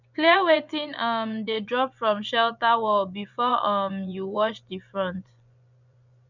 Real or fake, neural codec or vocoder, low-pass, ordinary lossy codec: fake; vocoder, 24 kHz, 100 mel bands, Vocos; 7.2 kHz; none